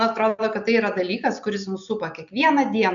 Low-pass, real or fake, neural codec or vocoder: 7.2 kHz; real; none